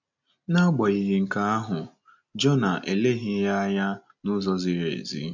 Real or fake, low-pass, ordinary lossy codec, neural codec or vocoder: real; 7.2 kHz; none; none